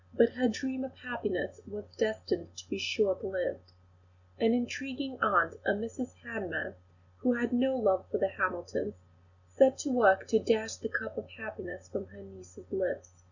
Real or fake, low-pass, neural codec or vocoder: real; 7.2 kHz; none